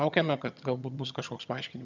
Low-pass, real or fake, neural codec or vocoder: 7.2 kHz; fake; vocoder, 22.05 kHz, 80 mel bands, HiFi-GAN